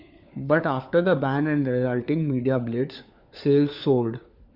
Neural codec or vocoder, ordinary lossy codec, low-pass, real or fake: codec, 16 kHz, 4 kbps, FunCodec, trained on LibriTTS, 50 frames a second; Opus, 64 kbps; 5.4 kHz; fake